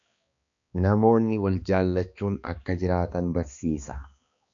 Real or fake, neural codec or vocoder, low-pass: fake; codec, 16 kHz, 2 kbps, X-Codec, HuBERT features, trained on balanced general audio; 7.2 kHz